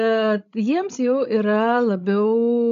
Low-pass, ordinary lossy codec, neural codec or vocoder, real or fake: 7.2 kHz; MP3, 96 kbps; codec, 16 kHz, 16 kbps, FreqCodec, larger model; fake